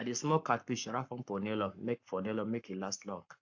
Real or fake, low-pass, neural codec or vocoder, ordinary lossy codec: fake; 7.2 kHz; codec, 44.1 kHz, 7.8 kbps, Pupu-Codec; AAC, 48 kbps